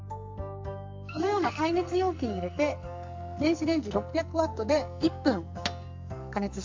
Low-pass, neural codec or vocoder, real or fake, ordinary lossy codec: 7.2 kHz; codec, 44.1 kHz, 2.6 kbps, SNAC; fake; MP3, 64 kbps